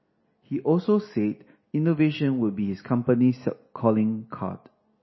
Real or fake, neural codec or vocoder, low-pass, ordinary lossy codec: real; none; 7.2 kHz; MP3, 24 kbps